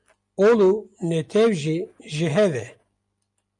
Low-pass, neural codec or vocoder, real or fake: 10.8 kHz; none; real